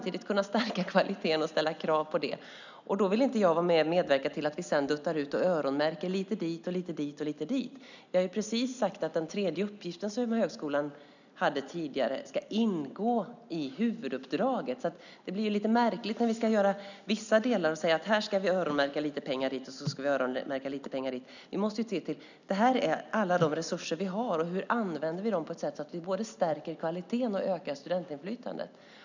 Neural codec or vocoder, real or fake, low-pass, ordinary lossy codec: none; real; 7.2 kHz; none